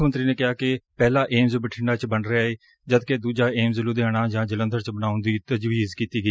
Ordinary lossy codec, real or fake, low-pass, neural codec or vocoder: none; real; none; none